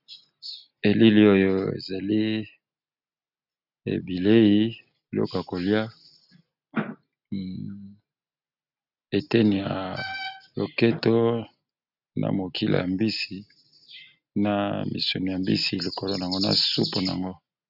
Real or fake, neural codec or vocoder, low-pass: real; none; 5.4 kHz